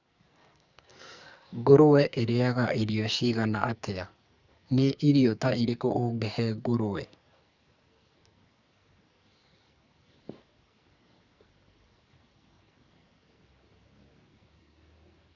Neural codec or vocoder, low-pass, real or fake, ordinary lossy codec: codec, 44.1 kHz, 2.6 kbps, SNAC; 7.2 kHz; fake; none